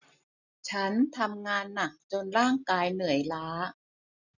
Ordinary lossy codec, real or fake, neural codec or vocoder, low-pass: none; real; none; 7.2 kHz